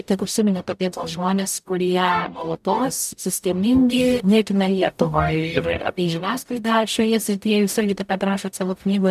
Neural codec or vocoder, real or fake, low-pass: codec, 44.1 kHz, 0.9 kbps, DAC; fake; 14.4 kHz